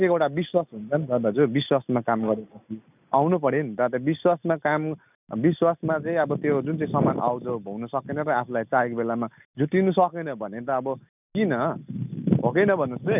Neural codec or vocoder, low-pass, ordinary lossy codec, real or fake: none; 3.6 kHz; none; real